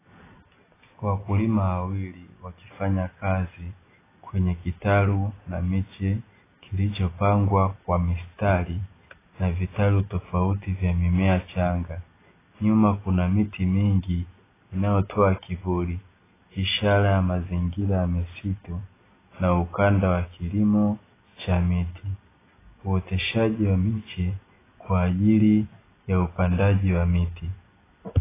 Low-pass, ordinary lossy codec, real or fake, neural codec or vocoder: 3.6 kHz; AAC, 16 kbps; real; none